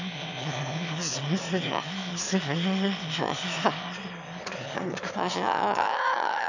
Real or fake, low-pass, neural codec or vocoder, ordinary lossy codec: fake; 7.2 kHz; autoencoder, 22.05 kHz, a latent of 192 numbers a frame, VITS, trained on one speaker; none